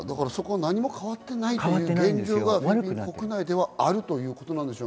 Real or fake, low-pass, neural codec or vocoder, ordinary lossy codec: real; none; none; none